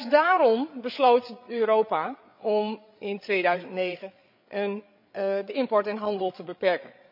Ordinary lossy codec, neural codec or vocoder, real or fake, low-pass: MP3, 48 kbps; codec, 16 kHz, 8 kbps, FreqCodec, larger model; fake; 5.4 kHz